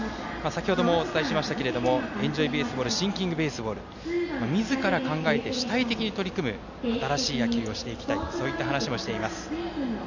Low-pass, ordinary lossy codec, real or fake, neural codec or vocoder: 7.2 kHz; none; real; none